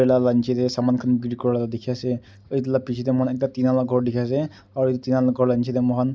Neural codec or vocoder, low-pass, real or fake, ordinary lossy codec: none; none; real; none